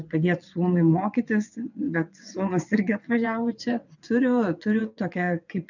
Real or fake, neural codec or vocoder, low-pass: fake; vocoder, 22.05 kHz, 80 mel bands, WaveNeXt; 7.2 kHz